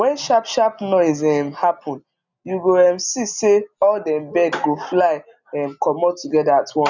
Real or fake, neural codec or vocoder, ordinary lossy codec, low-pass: real; none; none; 7.2 kHz